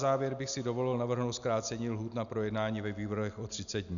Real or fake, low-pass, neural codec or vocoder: real; 7.2 kHz; none